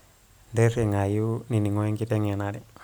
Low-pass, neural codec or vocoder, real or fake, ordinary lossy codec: none; none; real; none